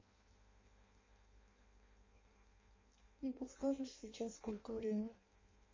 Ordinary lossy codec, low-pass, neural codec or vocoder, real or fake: MP3, 32 kbps; 7.2 kHz; codec, 16 kHz in and 24 kHz out, 0.6 kbps, FireRedTTS-2 codec; fake